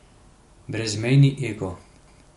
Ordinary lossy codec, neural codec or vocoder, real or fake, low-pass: MP3, 48 kbps; none; real; 14.4 kHz